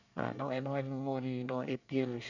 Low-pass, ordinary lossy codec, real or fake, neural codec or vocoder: 7.2 kHz; none; fake; codec, 24 kHz, 1 kbps, SNAC